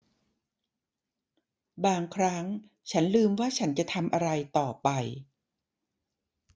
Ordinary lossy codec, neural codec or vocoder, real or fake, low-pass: none; none; real; none